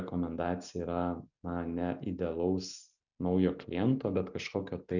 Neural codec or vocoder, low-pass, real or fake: none; 7.2 kHz; real